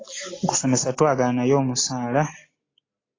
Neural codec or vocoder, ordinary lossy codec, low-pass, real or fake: none; AAC, 32 kbps; 7.2 kHz; real